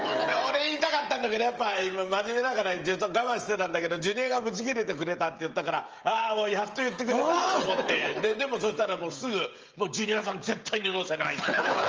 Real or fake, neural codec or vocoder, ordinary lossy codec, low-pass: fake; codec, 16 kHz, 16 kbps, FreqCodec, smaller model; Opus, 24 kbps; 7.2 kHz